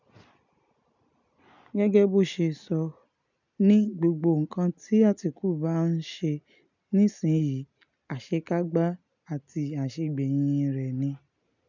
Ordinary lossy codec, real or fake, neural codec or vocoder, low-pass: none; real; none; 7.2 kHz